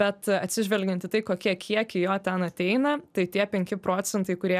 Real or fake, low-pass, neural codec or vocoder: real; 14.4 kHz; none